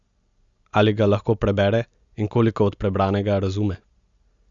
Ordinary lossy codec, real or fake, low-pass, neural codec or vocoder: Opus, 64 kbps; real; 7.2 kHz; none